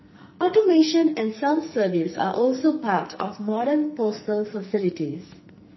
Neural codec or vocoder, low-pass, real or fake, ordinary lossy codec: codec, 44.1 kHz, 2.6 kbps, SNAC; 7.2 kHz; fake; MP3, 24 kbps